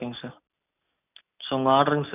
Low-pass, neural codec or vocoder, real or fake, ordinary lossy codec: 3.6 kHz; none; real; none